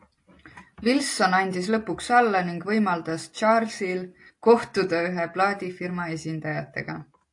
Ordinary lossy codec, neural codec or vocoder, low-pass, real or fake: AAC, 48 kbps; none; 10.8 kHz; real